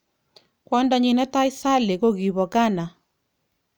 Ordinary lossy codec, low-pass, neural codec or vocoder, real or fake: none; none; none; real